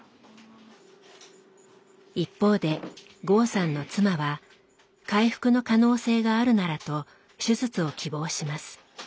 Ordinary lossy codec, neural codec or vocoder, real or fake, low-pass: none; none; real; none